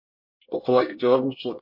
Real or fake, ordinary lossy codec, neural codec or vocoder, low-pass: fake; MP3, 32 kbps; codec, 24 kHz, 1 kbps, SNAC; 5.4 kHz